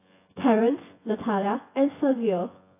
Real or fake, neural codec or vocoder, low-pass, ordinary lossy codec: fake; vocoder, 24 kHz, 100 mel bands, Vocos; 3.6 kHz; AAC, 24 kbps